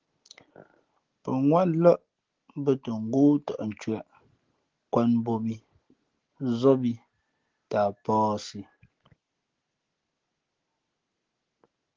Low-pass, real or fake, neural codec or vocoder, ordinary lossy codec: 7.2 kHz; real; none; Opus, 16 kbps